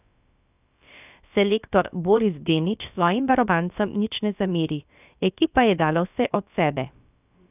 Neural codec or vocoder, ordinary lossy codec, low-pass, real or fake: codec, 16 kHz, about 1 kbps, DyCAST, with the encoder's durations; none; 3.6 kHz; fake